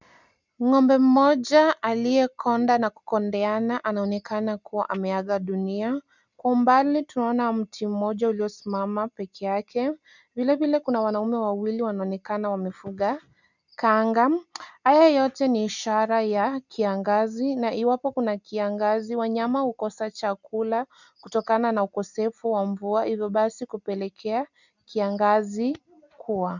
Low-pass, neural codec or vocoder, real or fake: 7.2 kHz; none; real